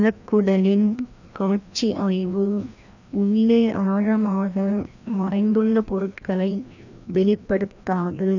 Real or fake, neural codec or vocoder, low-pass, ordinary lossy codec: fake; codec, 16 kHz, 1 kbps, FreqCodec, larger model; 7.2 kHz; none